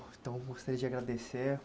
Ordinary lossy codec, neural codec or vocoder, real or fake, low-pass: none; none; real; none